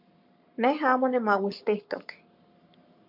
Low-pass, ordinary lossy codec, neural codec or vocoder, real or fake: 5.4 kHz; MP3, 48 kbps; codec, 44.1 kHz, 7.8 kbps, Pupu-Codec; fake